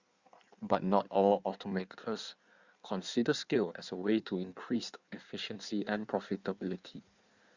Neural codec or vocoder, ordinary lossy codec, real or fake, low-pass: codec, 16 kHz in and 24 kHz out, 1.1 kbps, FireRedTTS-2 codec; Opus, 64 kbps; fake; 7.2 kHz